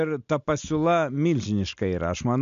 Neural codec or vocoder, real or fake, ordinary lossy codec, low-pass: none; real; MP3, 64 kbps; 7.2 kHz